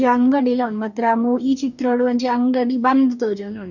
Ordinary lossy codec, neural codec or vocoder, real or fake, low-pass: none; codec, 44.1 kHz, 2.6 kbps, DAC; fake; 7.2 kHz